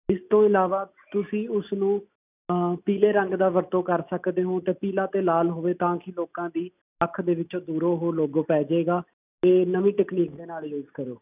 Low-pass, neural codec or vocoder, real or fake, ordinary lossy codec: 3.6 kHz; none; real; none